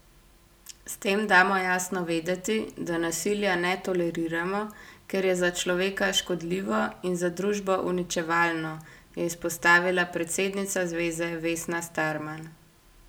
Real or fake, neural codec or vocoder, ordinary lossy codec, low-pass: real; none; none; none